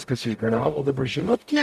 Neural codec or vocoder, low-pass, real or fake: codec, 44.1 kHz, 0.9 kbps, DAC; 14.4 kHz; fake